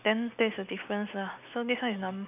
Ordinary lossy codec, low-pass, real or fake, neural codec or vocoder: none; 3.6 kHz; real; none